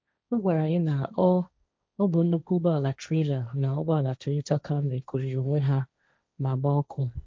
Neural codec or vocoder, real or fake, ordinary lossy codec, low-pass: codec, 16 kHz, 1.1 kbps, Voila-Tokenizer; fake; none; none